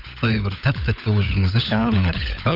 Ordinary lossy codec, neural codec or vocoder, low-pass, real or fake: none; codec, 16 kHz, 16 kbps, FunCodec, trained on LibriTTS, 50 frames a second; 5.4 kHz; fake